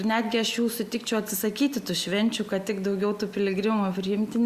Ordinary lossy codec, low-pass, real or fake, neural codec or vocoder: Opus, 64 kbps; 14.4 kHz; real; none